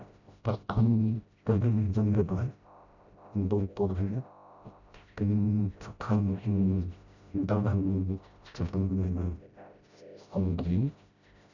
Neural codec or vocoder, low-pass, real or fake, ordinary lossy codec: codec, 16 kHz, 0.5 kbps, FreqCodec, smaller model; 7.2 kHz; fake; none